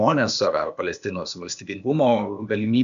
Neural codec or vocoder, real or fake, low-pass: codec, 16 kHz, 0.8 kbps, ZipCodec; fake; 7.2 kHz